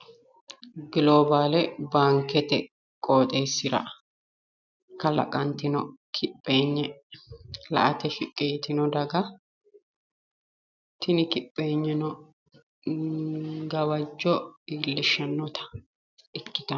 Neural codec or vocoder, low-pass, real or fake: none; 7.2 kHz; real